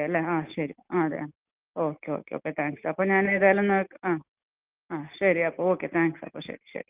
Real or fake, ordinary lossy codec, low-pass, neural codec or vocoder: real; Opus, 24 kbps; 3.6 kHz; none